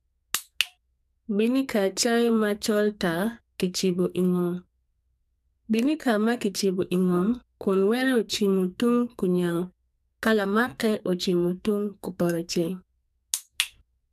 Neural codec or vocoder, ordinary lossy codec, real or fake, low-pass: codec, 44.1 kHz, 2.6 kbps, SNAC; none; fake; 14.4 kHz